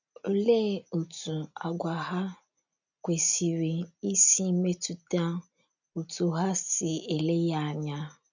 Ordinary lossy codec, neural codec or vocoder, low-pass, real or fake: none; none; 7.2 kHz; real